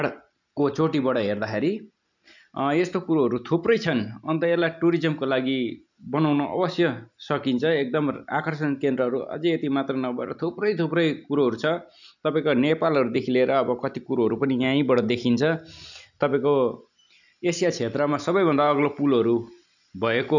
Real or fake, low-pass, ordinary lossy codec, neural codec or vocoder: real; 7.2 kHz; none; none